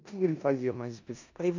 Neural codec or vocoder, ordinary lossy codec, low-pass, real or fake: codec, 16 kHz in and 24 kHz out, 0.9 kbps, LongCat-Audio-Codec, four codebook decoder; none; 7.2 kHz; fake